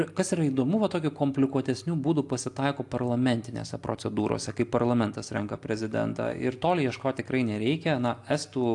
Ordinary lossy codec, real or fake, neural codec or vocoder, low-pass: AAC, 64 kbps; real; none; 10.8 kHz